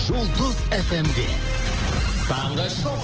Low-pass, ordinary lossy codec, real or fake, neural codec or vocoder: 7.2 kHz; Opus, 16 kbps; real; none